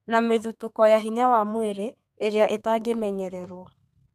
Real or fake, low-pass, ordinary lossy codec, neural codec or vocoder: fake; 14.4 kHz; MP3, 96 kbps; codec, 32 kHz, 1.9 kbps, SNAC